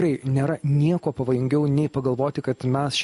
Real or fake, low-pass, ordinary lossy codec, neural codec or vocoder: real; 14.4 kHz; MP3, 48 kbps; none